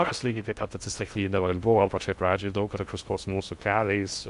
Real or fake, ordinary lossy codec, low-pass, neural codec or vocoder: fake; MP3, 64 kbps; 10.8 kHz; codec, 16 kHz in and 24 kHz out, 0.6 kbps, FocalCodec, streaming, 2048 codes